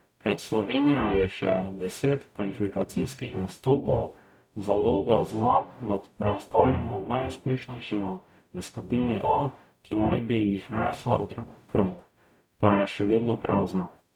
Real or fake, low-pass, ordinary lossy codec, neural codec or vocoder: fake; 19.8 kHz; none; codec, 44.1 kHz, 0.9 kbps, DAC